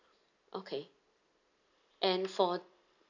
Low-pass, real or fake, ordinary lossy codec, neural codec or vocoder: 7.2 kHz; real; none; none